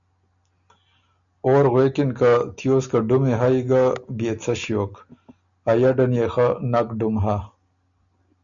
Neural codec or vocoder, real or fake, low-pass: none; real; 7.2 kHz